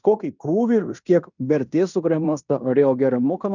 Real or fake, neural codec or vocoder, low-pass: fake; codec, 16 kHz in and 24 kHz out, 0.9 kbps, LongCat-Audio-Codec, fine tuned four codebook decoder; 7.2 kHz